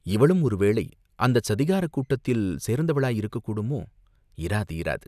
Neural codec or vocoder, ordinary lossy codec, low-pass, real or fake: none; none; 14.4 kHz; real